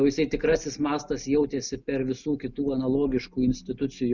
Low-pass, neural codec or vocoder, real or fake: 7.2 kHz; none; real